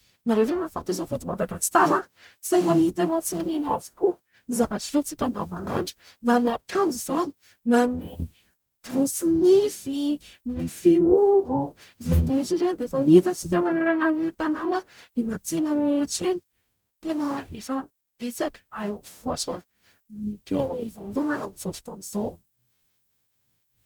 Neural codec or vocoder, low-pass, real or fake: codec, 44.1 kHz, 0.9 kbps, DAC; 19.8 kHz; fake